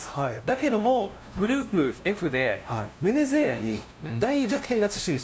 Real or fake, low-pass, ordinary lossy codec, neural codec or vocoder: fake; none; none; codec, 16 kHz, 0.5 kbps, FunCodec, trained on LibriTTS, 25 frames a second